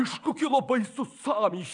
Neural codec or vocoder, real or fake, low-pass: vocoder, 22.05 kHz, 80 mel bands, WaveNeXt; fake; 9.9 kHz